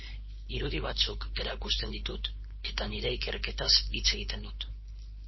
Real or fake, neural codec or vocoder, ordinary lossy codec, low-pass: fake; codec, 16 kHz, 4.8 kbps, FACodec; MP3, 24 kbps; 7.2 kHz